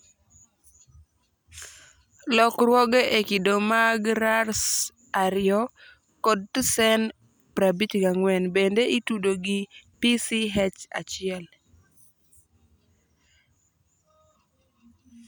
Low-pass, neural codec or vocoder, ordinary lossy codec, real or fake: none; none; none; real